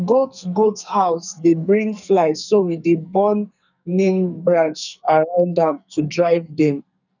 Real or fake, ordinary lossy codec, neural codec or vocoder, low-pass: fake; none; codec, 44.1 kHz, 2.6 kbps, SNAC; 7.2 kHz